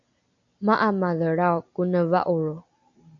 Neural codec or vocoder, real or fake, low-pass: none; real; 7.2 kHz